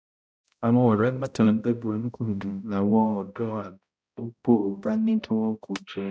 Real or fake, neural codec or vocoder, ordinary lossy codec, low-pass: fake; codec, 16 kHz, 0.5 kbps, X-Codec, HuBERT features, trained on balanced general audio; none; none